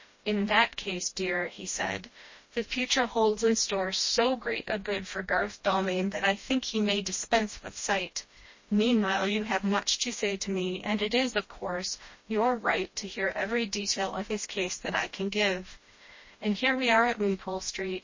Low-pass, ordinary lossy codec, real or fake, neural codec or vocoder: 7.2 kHz; MP3, 32 kbps; fake; codec, 16 kHz, 1 kbps, FreqCodec, smaller model